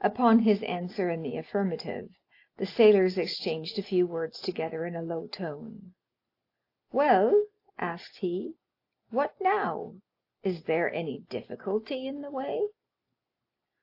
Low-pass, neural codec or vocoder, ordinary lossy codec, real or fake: 5.4 kHz; none; AAC, 32 kbps; real